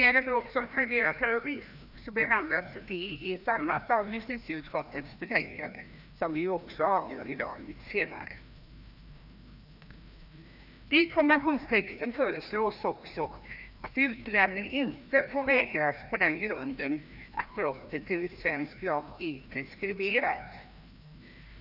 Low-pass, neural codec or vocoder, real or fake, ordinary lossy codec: 5.4 kHz; codec, 16 kHz, 1 kbps, FreqCodec, larger model; fake; none